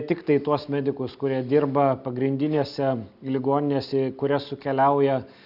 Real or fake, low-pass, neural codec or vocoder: real; 5.4 kHz; none